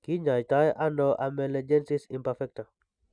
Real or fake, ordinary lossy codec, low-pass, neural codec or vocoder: real; none; 9.9 kHz; none